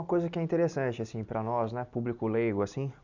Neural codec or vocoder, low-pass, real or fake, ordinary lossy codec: none; 7.2 kHz; real; none